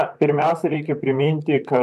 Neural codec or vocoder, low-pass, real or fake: vocoder, 44.1 kHz, 128 mel bands, Pupu-Vocoder; 14.4 kHz; fake